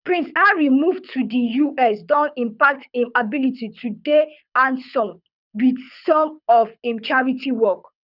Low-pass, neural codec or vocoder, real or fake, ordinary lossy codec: 5.4 kHz; codec, 24 kHz, 6 kbps, HILCodec; fake; none